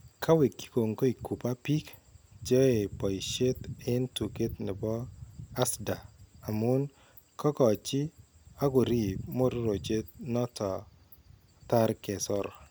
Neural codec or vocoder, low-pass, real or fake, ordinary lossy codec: none; none; real; none